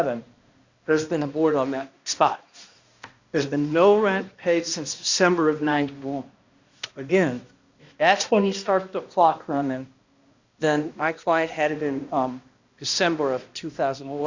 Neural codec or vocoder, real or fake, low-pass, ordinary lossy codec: codec, 16 kHz, 1 kbps, X-Codec, HuBERT features, trained on balanced general audio; fake; 7.2 kHz; Opus, 64 kbps